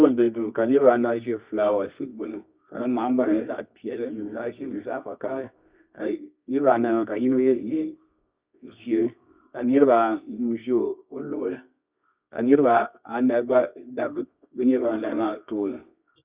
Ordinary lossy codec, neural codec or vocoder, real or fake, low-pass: Opus, 64 kbps; codec, 24 kHz, 0.9 kbps, WavTokenizer, medium music audio release; fake; 3.6 kHz